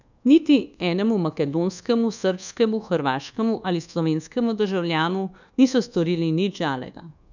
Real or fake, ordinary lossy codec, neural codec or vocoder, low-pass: fake; none; codec, 24 kHz, 1.2 kbps, DualCodec; 7.2 kHz